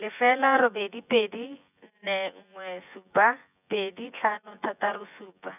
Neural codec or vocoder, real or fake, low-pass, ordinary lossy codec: vocoder, 24 kHz, 100 mel bands, Vocos; fake; 3.6 kHz; none